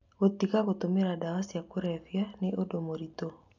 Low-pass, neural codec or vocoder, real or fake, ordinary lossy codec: 7.2 kHz; none; real; none